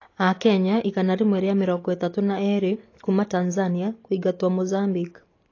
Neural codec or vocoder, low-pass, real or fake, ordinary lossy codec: none; 7.2 kHz; real; AAC, 32 kbps